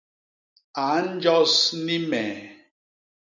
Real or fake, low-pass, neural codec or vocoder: real; 7.2 kHz; none